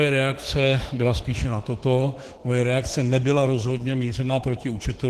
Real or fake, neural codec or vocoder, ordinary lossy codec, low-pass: fake; autoencoder, 48 kHz, 32 numbers a frame, DAC-VAE, trained on Japanese speech; Opus, 16 kbps; 14.4 kHz